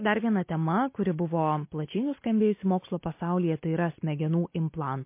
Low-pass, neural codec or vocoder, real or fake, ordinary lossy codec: 3.6 kHz; none; real; MP3, 24 kbps